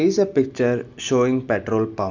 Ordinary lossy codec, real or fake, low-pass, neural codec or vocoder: none; real; 7.2 kHz; none